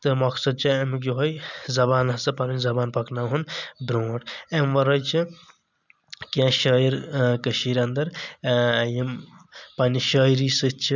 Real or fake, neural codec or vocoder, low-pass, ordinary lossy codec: real; none; 7.2 kHz; none